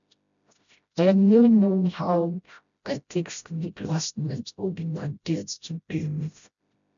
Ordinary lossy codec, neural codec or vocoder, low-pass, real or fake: none; codec, 16 kHz, 0.5 kbps, FreqCodec, smaller model; 7.2 kHz; fake